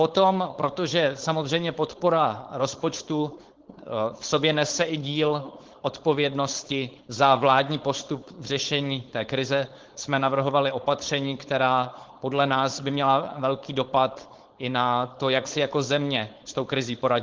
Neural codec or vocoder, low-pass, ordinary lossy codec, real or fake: codec, 16 kHz, 4.8 kbps, FACodec; 7.2 kHz; Opus, 16 kbps; fake